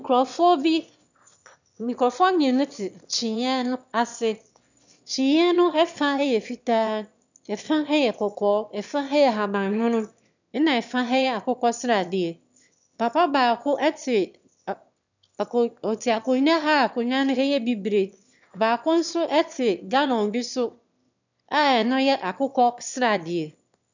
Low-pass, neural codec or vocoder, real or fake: 7.2 kHz; autoencoder, 22.05 kHz, a latent of 192 numbers a frame, VITS, trained on one speaker; fake